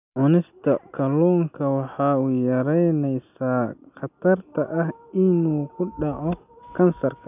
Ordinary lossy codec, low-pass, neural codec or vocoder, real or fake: none; 3.6 kHz; none; real